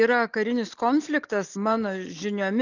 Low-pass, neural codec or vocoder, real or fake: 7.2 kHz; none; real